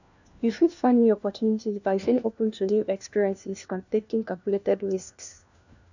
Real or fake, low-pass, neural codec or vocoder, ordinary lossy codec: fake; 7.2 kHz; codec, 16 kHz, 1 kbps, FunCodec, trained on LibriTTS, 50 frames a second; none